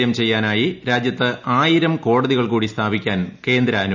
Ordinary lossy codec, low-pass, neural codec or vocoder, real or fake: none; 7.2 kHz; none; real